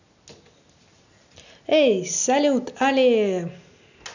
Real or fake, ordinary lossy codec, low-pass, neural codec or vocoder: real; none; 7.2 kHz; none